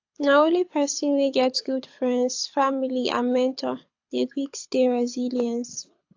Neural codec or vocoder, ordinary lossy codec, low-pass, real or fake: codec, 24 kHz, 6 kbps, HILCodec; AAC, 48 kbps; 7.2 kHz; fake